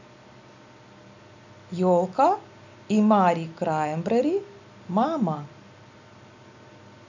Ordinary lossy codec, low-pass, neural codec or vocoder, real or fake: none; 7.2 kHz; none; real